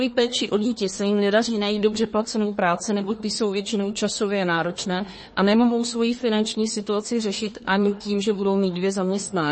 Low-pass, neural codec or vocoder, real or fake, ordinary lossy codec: 9.9 kHz; codec, 24 kHz, 1 kbps, SNAC; fake; MP3, 32 kbps